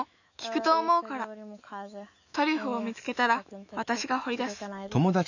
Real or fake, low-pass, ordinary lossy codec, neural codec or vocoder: fake; 7.2 kHz; none; autoencoder, 48 kHz, 128 numbers a frame, DAC-VAE, trained on Japanese speech